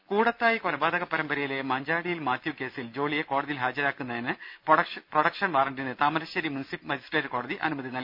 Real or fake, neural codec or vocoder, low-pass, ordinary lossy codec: real; none; 5.4 kHz; none